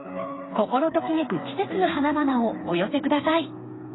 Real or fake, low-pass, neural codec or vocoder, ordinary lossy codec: fake; 7.2 kHz; codec, 16 kHz, 4 kbps, FreqCodec, smaller model; AAC, 16 kbps